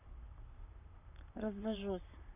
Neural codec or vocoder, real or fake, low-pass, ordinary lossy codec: vocoder, 44.1 kHz, 128 mel bands, Pupu-Vocoder; fake; 3.6 kHz; none